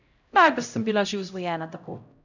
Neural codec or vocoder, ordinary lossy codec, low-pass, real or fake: codec, 16 kHz, 0.5 kbps, X-Codec, HuBERT features, trained on LibriSpeech; none; 7.2 kHz; fake